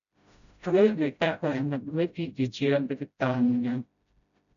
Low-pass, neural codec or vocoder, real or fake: 7.2 kHz; codec, 16 kHz, 0.5 kbps, FreqCodec, smaller model; fake